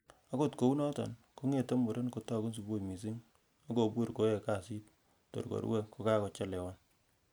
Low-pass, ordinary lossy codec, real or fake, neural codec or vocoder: none; none; real; none